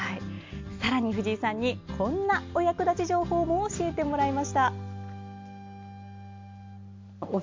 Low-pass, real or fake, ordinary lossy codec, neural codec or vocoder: 7.2 kHz; real; MP3, 48 kbps; none